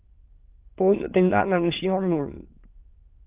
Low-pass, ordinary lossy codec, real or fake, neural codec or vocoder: 3.6 kHz; Opus, 32 kbps; fake; autoencoder, 22.05 kHz, a latent of 192 numbers a frame, VITS, trained on many speakers